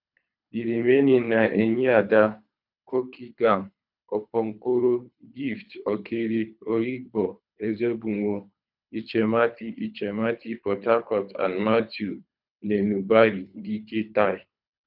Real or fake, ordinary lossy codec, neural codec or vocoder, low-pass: fake; none; codec, 24 kHz, 3 kbps, HILCodec; 5.4 kHz